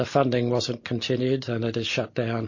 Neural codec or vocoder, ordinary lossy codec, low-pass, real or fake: none; MP3, 32 kbps; 7.2 kHz; real